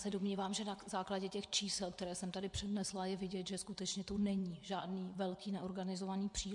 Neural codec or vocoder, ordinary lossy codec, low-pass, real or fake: vocoder, 24 kHz, 100 mel bands, Vocos; MP3, 64 kbps; 10.8 kHz; fake